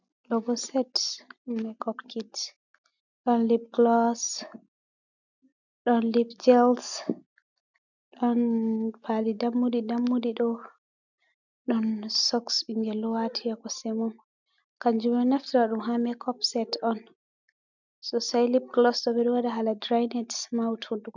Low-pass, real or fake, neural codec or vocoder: 7.2 kHz; real; none